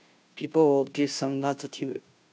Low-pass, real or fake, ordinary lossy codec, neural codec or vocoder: none; fake; none; codec, 16 kHz, 0.5 kbps, FunCodec, trained on Chinese and English, 25 frames a second